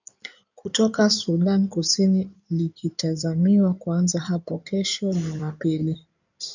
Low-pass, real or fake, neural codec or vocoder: 7.2 kHz; fake; codec, 16 kHz in and 24 kHz out, 2.2 kbps, FireRedTTS-2 codec